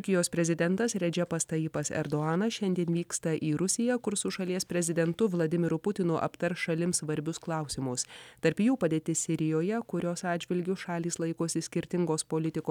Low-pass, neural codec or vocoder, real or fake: 19.8 kHz; none; real